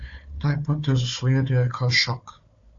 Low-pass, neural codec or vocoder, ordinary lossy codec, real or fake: 7.2 kHz; codec, 16 kHz, 4 kbps, FunCodec, trained on Chinese and English, 50 frames a second; AAC, 48 kbps; fake